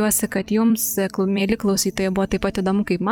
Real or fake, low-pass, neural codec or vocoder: fake; 19.8 kHz; vocoder, 44.1 kHz, 128 mel bands every 256 samples, BigVGAN v2